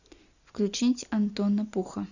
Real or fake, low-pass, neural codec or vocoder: real; 7.2 kHz; none